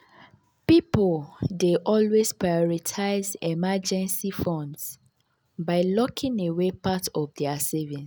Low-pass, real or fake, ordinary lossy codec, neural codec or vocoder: none; real; none; none